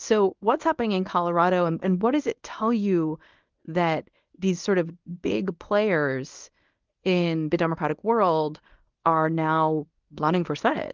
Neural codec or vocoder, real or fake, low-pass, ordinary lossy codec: codec, 24 kHz, 0.9 kbps, WavTokenizer, medium speech release version 1; fake; 7.2 kHz; Opus, 32 kbps